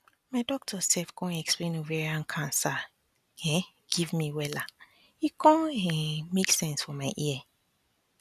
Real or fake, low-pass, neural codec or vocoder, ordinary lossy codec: real; 14.4 kHz; none; none